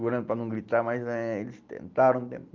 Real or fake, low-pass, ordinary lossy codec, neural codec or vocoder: real; 7.2 kHz; Opus, 24 kbps; none